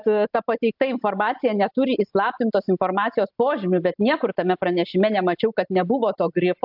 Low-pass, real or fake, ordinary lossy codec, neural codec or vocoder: 5.4 kHz; real; AAC, 48 kbps; none